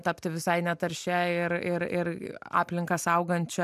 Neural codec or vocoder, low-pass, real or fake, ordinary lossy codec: none; 14.4 kHz; real; MP3, 96 kbps